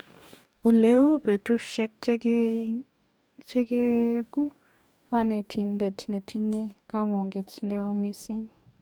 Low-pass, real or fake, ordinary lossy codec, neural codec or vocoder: none; fake; none; codec, 44.1 kHz, 2.6 kbps, DAC